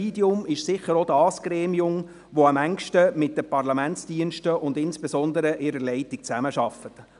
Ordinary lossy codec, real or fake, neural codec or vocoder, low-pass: none; real; none; 10.8 kHz